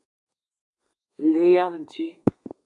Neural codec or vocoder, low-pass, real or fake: codec, 32 kHz, 1.9 kbps, SNAC; 10.8 kHz; fake